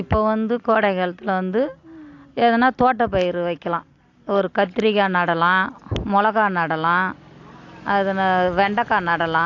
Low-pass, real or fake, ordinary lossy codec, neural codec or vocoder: 7.2 kHz; real; AAC, 48 kbps; none